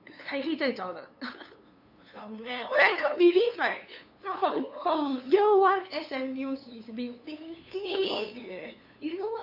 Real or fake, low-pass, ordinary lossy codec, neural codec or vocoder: fake; 5.4 kHz; AAC, 48 kbps; codec, 16 kHz, 2 kbps, FunCodec, trained on LibriTTS, 25 frames a second